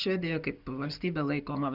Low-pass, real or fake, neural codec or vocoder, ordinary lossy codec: 5.4 kHz; real; none; Opus, 64 kbps